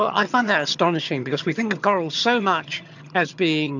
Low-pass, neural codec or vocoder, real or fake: 7.2 kHz; vocoder, 22.05 kHz, 80 mel bands, HiFi-GAN; fake